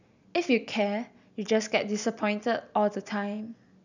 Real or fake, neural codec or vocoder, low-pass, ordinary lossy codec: real; none; 7.2 kHz; none